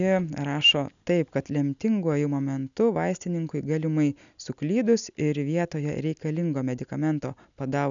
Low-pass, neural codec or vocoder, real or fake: 7.2 kHz; none; real